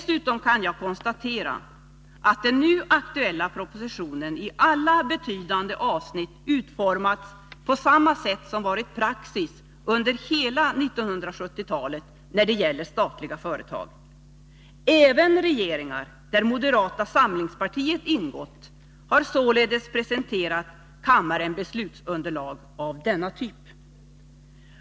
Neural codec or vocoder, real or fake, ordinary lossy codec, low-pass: none; real; none; none